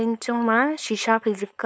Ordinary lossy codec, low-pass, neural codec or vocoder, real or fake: none; none; codec, 16 kHz, 4.8 kbps, FACodec; fake